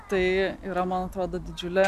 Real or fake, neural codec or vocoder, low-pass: real; none; 14.4 kHz